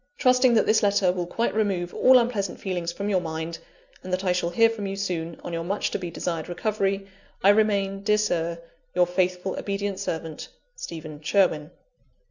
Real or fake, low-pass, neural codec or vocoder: real; 7.2 kHz; none